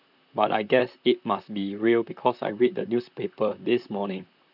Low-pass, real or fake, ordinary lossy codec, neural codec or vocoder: 5.4 kHz; fake; none; vocoder, 44.1 kHz, 128 mel bands, Pupu-Vocoder